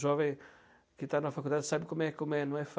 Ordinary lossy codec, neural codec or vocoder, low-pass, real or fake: none; none; none; real